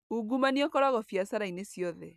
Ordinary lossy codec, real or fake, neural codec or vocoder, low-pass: none; real; none; 14.4 kHz